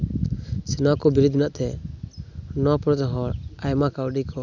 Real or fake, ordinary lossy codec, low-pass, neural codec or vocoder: real; none; 7.2 kHz; none